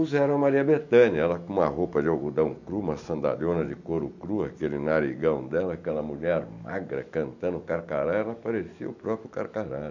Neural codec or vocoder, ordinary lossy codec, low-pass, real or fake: none; none; 7.2 kHz; real